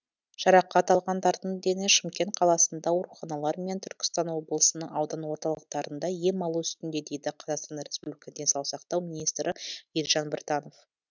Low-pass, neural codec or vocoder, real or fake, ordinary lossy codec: 7.2 kHz; none; real; none